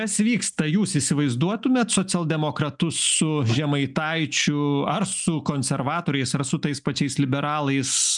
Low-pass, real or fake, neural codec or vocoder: 10.8 kHz; real; none